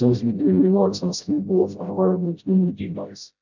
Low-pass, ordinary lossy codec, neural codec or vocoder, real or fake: 7.2 kHz; none; codec, 16 kHz, 0.5 kbps, FreqCodec, smaller model; fake